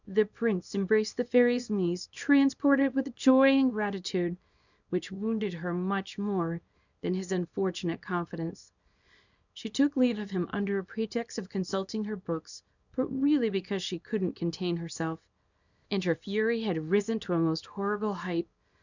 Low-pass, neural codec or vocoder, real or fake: 7.2 kHz; codec, 24 kHz, 0.9 kbps, WavTokenizer, small release; fake